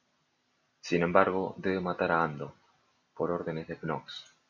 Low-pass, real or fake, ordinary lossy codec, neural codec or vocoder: 7.2 kHz; real; MP3, 48 kbps; none